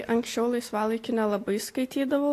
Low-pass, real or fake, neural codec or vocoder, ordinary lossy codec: 14.4 kHz; real; none; AAC, 64 kbps